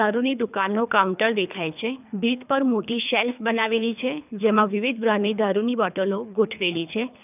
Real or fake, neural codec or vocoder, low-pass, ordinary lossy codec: fake; codec, 24 kHz, 3 kbps, HILCodec; 3.6 kHz; none